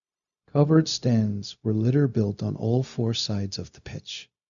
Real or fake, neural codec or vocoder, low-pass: fake; codec, 16 kHz, 0.4 kbps, LongCat-Audio-Codec; 7.2 kHz